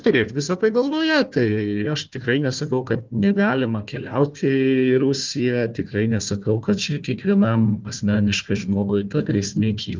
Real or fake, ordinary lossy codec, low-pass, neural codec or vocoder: fake; Opus, 24 kbps; 7.2 kHz; codec, 16 kHz, 1 kbps, FunCodec, trained on Chinese and English, 50 frames a second